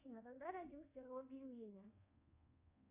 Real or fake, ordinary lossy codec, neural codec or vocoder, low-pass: fake; AAC, 24 kbps; codec, 24 kHz, 1.2 kbps, DualCodec; 3.6 kHz